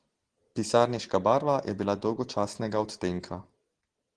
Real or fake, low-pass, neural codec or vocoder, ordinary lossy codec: real; 9.9 kHz; none; Opus, 16 kbps